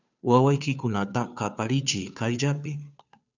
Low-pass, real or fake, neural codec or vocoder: 7.2 kHz; fake; codec, 16 kHz, 2 kbps, FunCodec, trained on Chinese and English, 25 frames a second